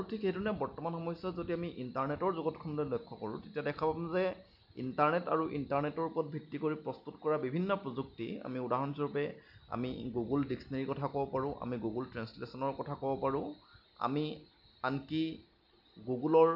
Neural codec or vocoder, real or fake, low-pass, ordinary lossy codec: none; real; 5.4 kHz; none